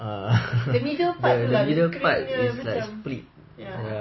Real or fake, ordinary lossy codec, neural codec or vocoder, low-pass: real; MP3, 24 kbps; none; 7.2 kHz